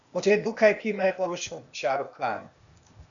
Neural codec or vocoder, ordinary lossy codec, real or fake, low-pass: codec, 16 kHz, 0.8 kbps, ZipCodec; MP3, 96 kbps; fake; 7.2 kHz